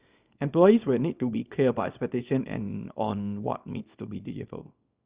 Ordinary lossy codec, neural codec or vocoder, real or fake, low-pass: Opus, 64 kbps; codec, 24 kHz, 0.9 kbps, WavTokenizer, small release; fake; 3.6 kHz